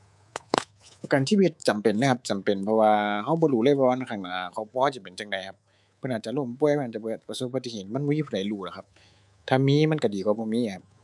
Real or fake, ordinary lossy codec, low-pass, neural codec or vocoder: fake; none; none; codec, 24 kHz, 3.1 kbps, DualCodec